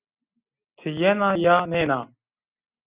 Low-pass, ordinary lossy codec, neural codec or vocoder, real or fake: 3.6 kHz; Opus, 64 kbps; none; real